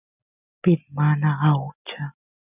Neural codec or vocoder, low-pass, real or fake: none; 3.6 kHz; real